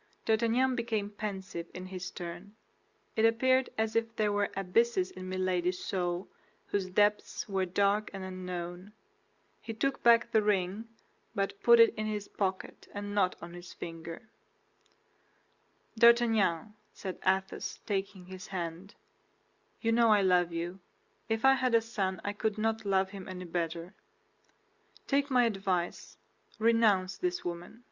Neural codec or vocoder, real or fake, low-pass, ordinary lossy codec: none; real; 7.2 kHz; Opus, 64 kbps